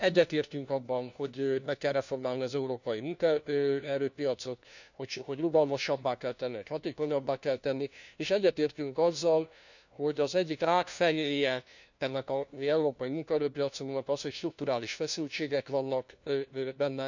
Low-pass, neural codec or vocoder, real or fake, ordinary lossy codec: 7.2 kHz; codec, 16 kHz, 1 kbps, FunCodec, trained on LibriTTS, 50 frames a second; fake; none